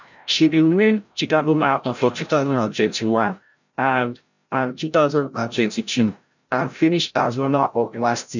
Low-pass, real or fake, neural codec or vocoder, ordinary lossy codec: 7.2 kHz; fake; codec, 16 kHz, 0.5 kbps, FreqCodec, larger model; none